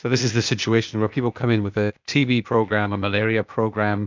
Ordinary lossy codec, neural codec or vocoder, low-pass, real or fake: MP3, 64 kbps; codec, 16 kHz, 0.8 kbps, ZipCodec; 7.2 kHz; fake